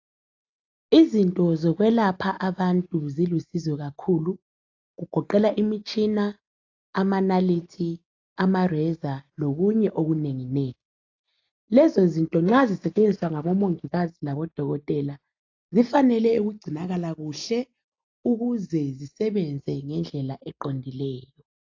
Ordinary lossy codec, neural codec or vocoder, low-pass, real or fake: AAC, 48 kbps; none; 7.2 kHz; real